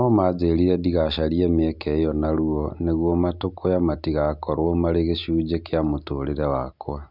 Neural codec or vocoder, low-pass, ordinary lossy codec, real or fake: none; 5.4 kHz; none; real